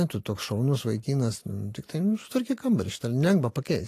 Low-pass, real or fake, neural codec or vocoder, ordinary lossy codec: 14.4 kHz; real; none; AAC, 48 kbps